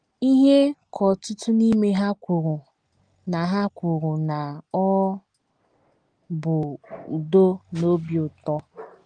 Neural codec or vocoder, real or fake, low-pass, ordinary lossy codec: none; real; 9.9 kHz; Opus, 24 kbps